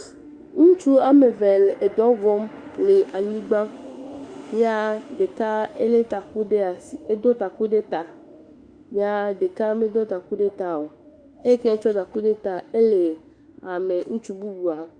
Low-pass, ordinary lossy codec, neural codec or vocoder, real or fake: 9.9 kHz; Opus, 64 kbps; autoencoder, 48 kHz, 32 numbers a frame, DAC-VAE, trained on Japanese speech; fake